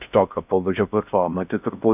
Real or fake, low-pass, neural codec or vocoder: fake; 3.6 kHz; codec, 16 kHz in and 24 kHz out, 0.6 kbps, FocalCodec, streaming, 4096 codes